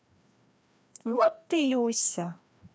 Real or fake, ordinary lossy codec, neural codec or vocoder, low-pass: fake; none; codec, 16 kHz, 1 kbps, FreqCodec, larger model; none